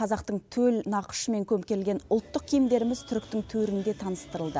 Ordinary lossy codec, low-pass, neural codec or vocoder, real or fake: none; none; none; real